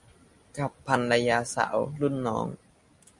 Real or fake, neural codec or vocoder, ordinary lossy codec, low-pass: real; none; MP3, 96 kbps; 10.8 kHz